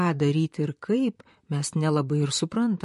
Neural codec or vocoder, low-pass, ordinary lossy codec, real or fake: vocoder, 44.1 kHz, 128 mel bands, Pupu-Vocoder; 14.4 kHz; MP3, 48 kbps; fake